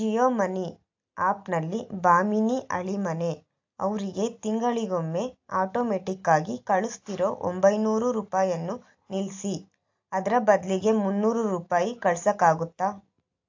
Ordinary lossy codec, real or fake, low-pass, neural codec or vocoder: AAC, 48 kbps; real; 7.2 kHz; none